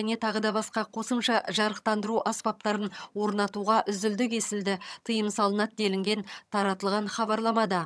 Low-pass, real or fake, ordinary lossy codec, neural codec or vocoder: none; fake; none; vocoder, 22.05 kHz, 80 mel bands, HiFi-GAN